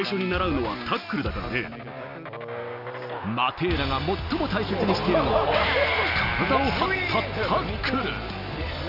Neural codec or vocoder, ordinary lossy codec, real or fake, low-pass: none; none; real; 5.4 kHz